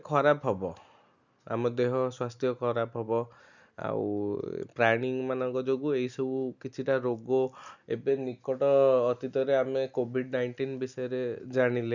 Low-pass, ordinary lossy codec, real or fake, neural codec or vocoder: 7.2 kHz; none; real; none